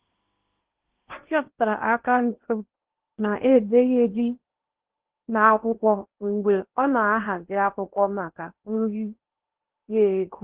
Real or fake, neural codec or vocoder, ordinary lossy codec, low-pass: fake; codec, 16 kHz in and 24 kHz out, 0.8 kbps, FocalCodec, streaming, 65536 codes; Opus, 16 kbps; 3.6 kHz